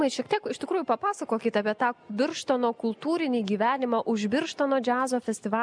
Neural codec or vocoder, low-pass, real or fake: none; 9.9 kHz; real